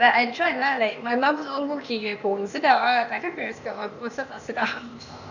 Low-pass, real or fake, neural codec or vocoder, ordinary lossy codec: 7.2 kHz; fake; codec, 16 kHz, 0.8 kbps, ZipCodec; none